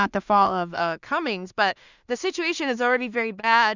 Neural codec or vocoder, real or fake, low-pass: codec, 16 kHz in and 24 kHz out, 0.4 kbps, LongCat-Audio-Codec, two codebook decoder; fake; 7.2 kHz